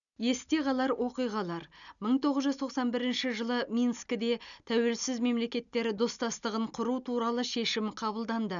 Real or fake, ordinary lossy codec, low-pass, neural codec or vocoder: real; none; 7.2 kHz; none